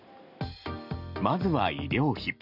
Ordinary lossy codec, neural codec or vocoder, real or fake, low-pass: none; none; real; 5.4 kHz